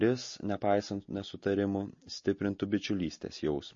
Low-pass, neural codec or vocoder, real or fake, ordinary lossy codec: 7.2 kHz; none; real; MP3, 32 kbps